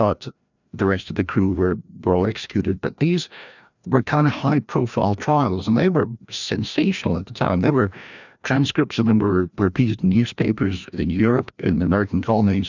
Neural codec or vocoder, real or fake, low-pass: codec, 16 kHz, 1 kbps, FreqCodec, larger model; fake; 7.2 kHz